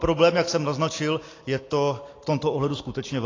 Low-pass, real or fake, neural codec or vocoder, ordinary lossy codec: 7.2 kHz; real; none; AAC, 32 kbps